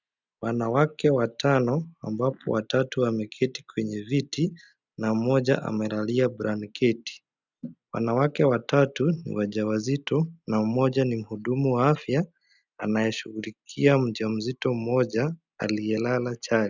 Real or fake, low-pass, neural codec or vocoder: real; 7.2 kHz; none